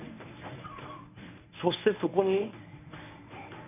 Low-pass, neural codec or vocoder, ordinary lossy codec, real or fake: 3.6 kHz; codec, 24 kHz, 0.9 kbps, WavTokenizer, medium speech release version 1; none; fake